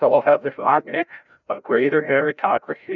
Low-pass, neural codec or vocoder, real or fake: 7.2 kHz; codec, 16 kHz, 0.5 kbps, FreqCodec, larger model; fake